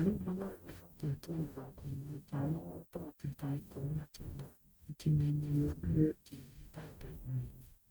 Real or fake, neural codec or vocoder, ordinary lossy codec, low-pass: fake; codec, 44.1 kHz, 0.9 kbps, DAC; none; none